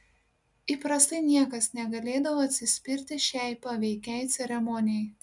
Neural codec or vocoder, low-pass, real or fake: none; 10.8 kHz; real